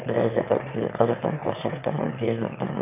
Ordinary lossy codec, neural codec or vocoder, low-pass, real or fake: none; autoencoder, 22.05 kHz, a latent of 192 numbers a frame, VITS, trained on one speaker; 3.6 kHz; fake